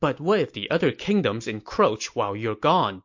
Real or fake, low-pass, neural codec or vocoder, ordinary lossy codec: real; 7.2 kHz; none; MP3, 48 kbps